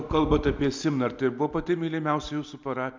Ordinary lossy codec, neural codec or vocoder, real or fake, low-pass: MP3, 64 kbps; none; real; 7.2 kHz